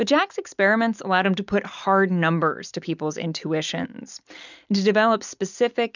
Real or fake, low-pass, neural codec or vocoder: real; 7.2 kHz; none